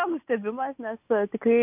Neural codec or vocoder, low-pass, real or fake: none; 3.6 kHz; real